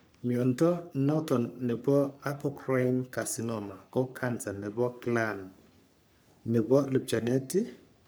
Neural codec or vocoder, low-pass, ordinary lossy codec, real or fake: codec, 44.1 kHz, 3.4 kbps, Pupu-Codec; none; none; fake